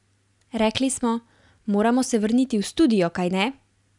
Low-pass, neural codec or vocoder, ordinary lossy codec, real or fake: 10.8 kHz; none; none; real